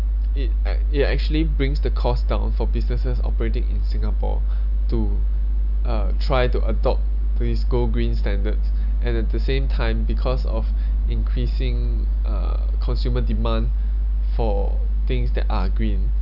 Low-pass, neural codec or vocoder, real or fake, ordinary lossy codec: 5.4 kHz; none; real; none